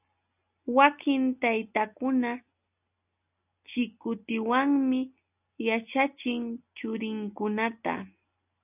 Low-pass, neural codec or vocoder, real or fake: 3.6 kHz; none; real